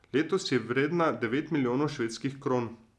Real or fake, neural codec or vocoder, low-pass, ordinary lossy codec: real; none; none; none